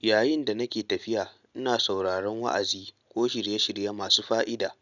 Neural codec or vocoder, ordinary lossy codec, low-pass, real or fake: none; none; 7.2 kHz; real